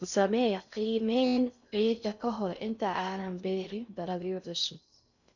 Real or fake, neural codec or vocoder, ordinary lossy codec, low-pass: fake; codec, 16 kHz in and 24 kHz out, 0.6 kbps, FocalCodec, streaming, 4096 codes; none; 7.2 kHz